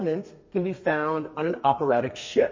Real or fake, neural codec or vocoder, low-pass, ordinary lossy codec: fake; codec, 32 kHz, 1.9 kbps, SNAC; 7.2 kHz; MP3, 32 kbps